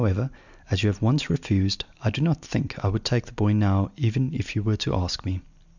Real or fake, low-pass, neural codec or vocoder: real; 7.2 kHz; none